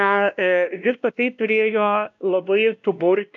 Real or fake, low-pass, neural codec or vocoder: fake; 7.2 kHz; codec, 16 kHz, 1 kbps, X-Codec, WavLM features, trained on Multilingual LibriSpeech